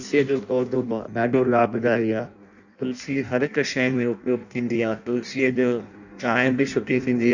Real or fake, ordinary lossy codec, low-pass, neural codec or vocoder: fake; none; 7.2 kHz; codec, 16 kHz in and 24 kHz out, 0.6 kbps, FireRedTTS-2 codec